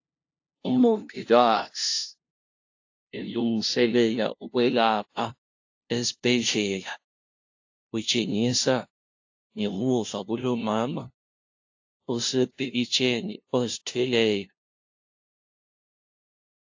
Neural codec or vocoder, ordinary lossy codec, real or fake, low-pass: codec, 16 kHz, 0.5 kbps, FunCodec, trained on LibriTTS, 25 frames a second; AAC, 48 kbps; fake; 7.2 kHz